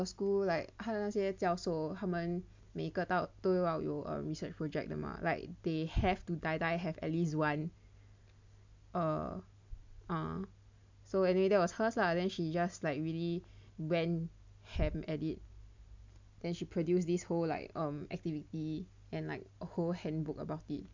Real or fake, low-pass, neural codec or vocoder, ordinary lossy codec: real; 7.2 kHz; none; MP3, 64 kbps